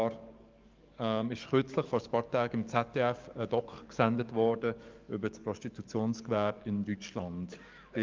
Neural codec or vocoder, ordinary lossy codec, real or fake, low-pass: codec, 44.1 kHz, 7.8 kbps, DAC; Opus, 32 kbps; fake; 7.2 kHz